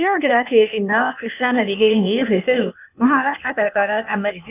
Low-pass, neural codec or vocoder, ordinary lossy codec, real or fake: 3.6 kHz; codec, 16 kHz, 0.8 kbps, ZipCodec; none; fake